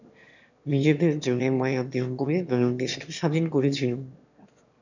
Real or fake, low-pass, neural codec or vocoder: fake; 7.2 kHz; autoencoder, 22.05 kHz, a latent of 192 numbers a frame, VITS, trained on one speaker